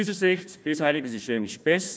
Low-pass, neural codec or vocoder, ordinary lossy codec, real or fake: none; codec, 16 kHz, 1 kbps, FunCodec, trained on Chinese and English, 50 frames a second; none; fake